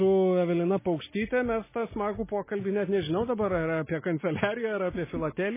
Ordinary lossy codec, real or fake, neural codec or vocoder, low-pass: MP3, 16 kbps; real; none; 3.6 kHz